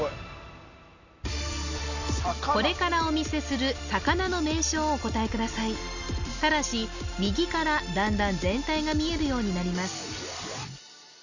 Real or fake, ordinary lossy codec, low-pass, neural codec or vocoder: real; none; 7.2 kHz; none